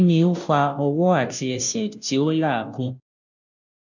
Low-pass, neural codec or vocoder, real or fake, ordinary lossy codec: 7.2 kHz; codec, 16 kHz, 0.5 kbps, FunCodec, trained on Chinese and English, 25 frames a second; fake; none